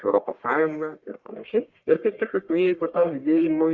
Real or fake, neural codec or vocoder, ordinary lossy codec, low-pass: fake; codec, 44.1 kHz, 1.7 kbps, Pupu-Codec; Opus, 64 kbps; 7.2 kHz